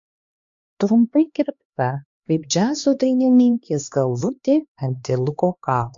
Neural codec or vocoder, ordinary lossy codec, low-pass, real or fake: codec, 16 kHz, 2 kbps, X-Codec, HuBERT features, trained on LibriSpeech; MP3, 48 kbps; 7.2 kHz; fake